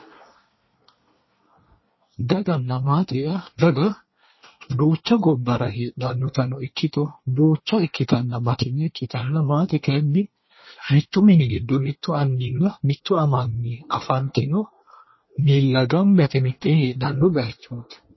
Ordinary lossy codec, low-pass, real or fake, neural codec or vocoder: MP3, 24 kbps; 7.2 kHz; fake; codec, 24 kHz, 1 kbps, SNAC